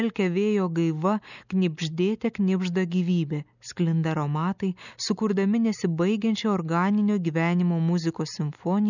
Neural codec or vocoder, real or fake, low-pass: none; real; 7.2 kHz